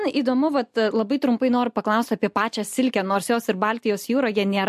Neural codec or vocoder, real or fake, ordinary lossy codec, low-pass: none; real; MP3, 64 kbps; 14.4 kHz